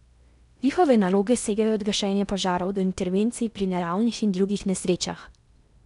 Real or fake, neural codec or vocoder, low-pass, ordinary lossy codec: fake; codec, 16 kHz in and 24 kHz out, 0.8 kbps, FocalCodec, streaming, 65536 codes; 10.8 kHz; none